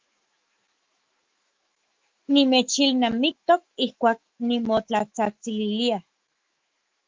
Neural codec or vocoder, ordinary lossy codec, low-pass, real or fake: autoencoder, 48 kHz, 128 numbers a frame, DAC-VAE, trained on Japanese speech; Opus, 32 kbps; 7.2 kHz; fake